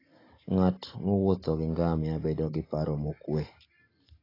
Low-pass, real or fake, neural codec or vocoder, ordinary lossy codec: 5.4 kHz; real; none; AAC, 24 kbps